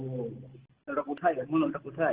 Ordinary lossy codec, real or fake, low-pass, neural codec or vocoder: Opus, 16 kbps; real; 3.6 kHz; none